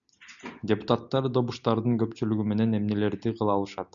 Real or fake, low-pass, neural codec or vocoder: real; 7.2 kHz; none